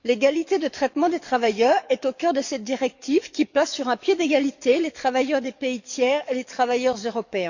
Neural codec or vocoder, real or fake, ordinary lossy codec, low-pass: codec, 44.1 kHz, 7.8 kbps, DAC; fake; none; 7.2 kHz